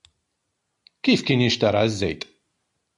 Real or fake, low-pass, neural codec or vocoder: real; 10.8 kHz; none